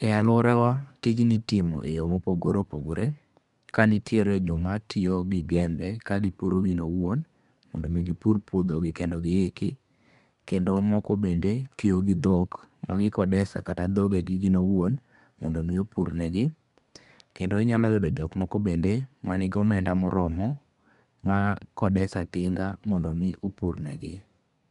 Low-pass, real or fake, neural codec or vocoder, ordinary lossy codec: 10.8 kHz; fake; codec, 24 kHz, 1 kbps, SNAC; none